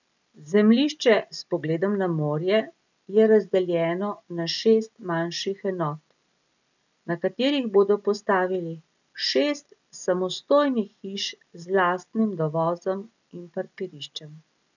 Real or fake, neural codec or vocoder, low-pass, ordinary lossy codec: real; none; 7.2 kHz; none